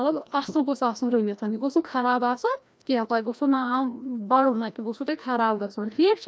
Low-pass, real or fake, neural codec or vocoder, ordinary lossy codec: none; fake; codec, 16 kHz, 1 kbps, FreqCodec, larger model; none